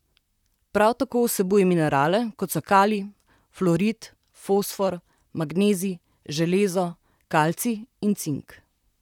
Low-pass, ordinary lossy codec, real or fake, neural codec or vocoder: 19.8 kHz; none; fake; vocoder, 44.1 kHz, 128 mel bands, Pupu-Vocoder